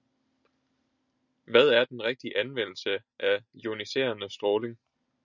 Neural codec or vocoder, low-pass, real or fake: none; 7.2 kHz; real